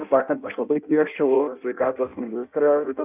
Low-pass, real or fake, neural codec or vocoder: 3.6 kHz; fake; codec, 16 kHz in and 24 kHz out, 0.6 kbps, FireRedTTS-2 codec